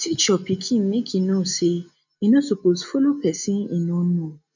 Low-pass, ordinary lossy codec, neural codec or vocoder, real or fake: 7.2 kHz; none; none; real